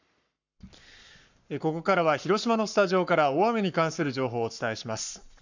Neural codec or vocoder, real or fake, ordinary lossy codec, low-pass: codec, 44.1 kHz, 7.8 kbps, Pupu-Codec; fake; none; 7.2 kHz